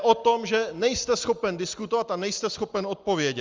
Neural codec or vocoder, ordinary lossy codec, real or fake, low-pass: none; Opus, 32 kbps; real; 7.2 kHz